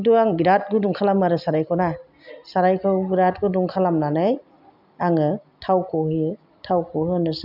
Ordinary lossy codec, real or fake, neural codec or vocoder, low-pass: none; real; none; 5.4 kHz